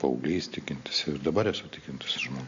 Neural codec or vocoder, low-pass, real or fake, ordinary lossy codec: none; 7.2 kHz; real; AAC, 64 kbps